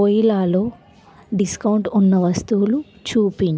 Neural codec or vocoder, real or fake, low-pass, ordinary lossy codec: none; real; none; none